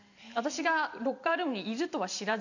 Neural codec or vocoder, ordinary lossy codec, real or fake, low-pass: vocoder, 44.1 kHz, 128 mel bands every 512 samples, BigVGAN v2; none; fake; 7.2 kHz